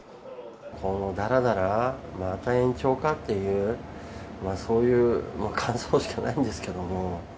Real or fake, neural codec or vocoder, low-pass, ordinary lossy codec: real; none; none; none